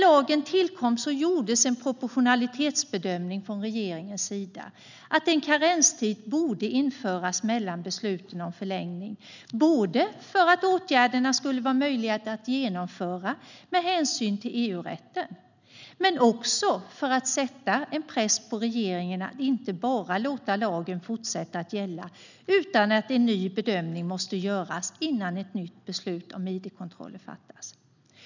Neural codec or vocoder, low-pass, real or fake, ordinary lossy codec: none; 7.2 kHz; real; none